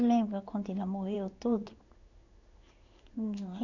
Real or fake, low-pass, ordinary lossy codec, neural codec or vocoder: fake; 7.2 kHz; none; codec, 16 kHz in and 24 kHz out, 1 kbps, XY-Tokenizer